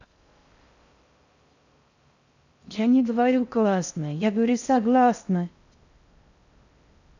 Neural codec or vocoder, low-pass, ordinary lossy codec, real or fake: codec, 16 kHz in and 24 kHz out, 0.6 kbps, FocalCodec, streaming, 4096 codes; 7.2 kHz; none; fake